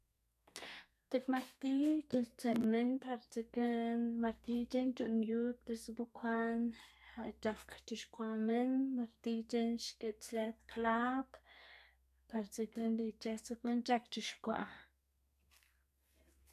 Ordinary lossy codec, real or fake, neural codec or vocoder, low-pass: none; fake; codec, 32 kHz, 1.9 kbps, SNAC; 14.4 kHz